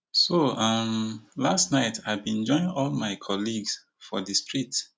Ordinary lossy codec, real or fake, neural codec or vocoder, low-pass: none; real; none; none